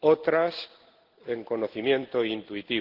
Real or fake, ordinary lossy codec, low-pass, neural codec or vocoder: real; Opus, 16 kbps; 5.4 kHz; none